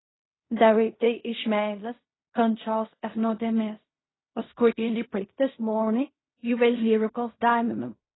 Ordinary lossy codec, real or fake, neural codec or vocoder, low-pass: AAC, 16 kbps; fake; codec, 16 kHz in and 24 kHz out, 0.4 kbps, LongCat-Audio-Codec, fine tuned four codebook decoder; 7.2 kHz